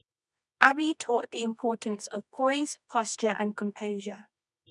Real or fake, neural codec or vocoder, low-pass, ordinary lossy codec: fake; codec, 24 kHz, 0.9 kbps, WavTokenizer, medium music audio release; 10.8 kHz; none